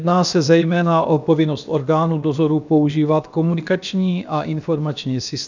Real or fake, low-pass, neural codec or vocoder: fake; 7.2 kHz; codec, 16 kHz, about 1 kbps, DyCAST, with the encoder's durations